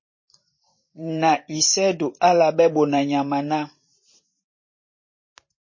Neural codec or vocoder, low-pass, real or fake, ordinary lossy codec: vocoder, 44.1 kHz, 128 mel bands every 512 samples, BigVGAN v2; 7.2 kHz; fake; MP3, 32 kbps